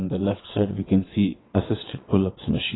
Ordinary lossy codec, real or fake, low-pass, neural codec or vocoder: AAC, 16 kbps; fake; 7.2 kHz; vocoder, 22.05 kHz, 80 mel bands, WaveNeXt